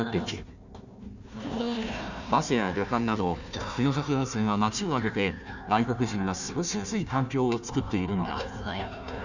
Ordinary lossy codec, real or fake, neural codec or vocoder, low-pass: none; fake; codec, 16 kHz, 1 kbps, FunCodec, trained on Chinese and English, 50 frames a second; 7.2 kHz